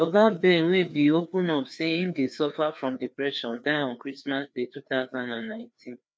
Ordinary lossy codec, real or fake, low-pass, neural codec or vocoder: none; fake; none; codec, 16 kHz, 2 kbps, FreqCodec, larger model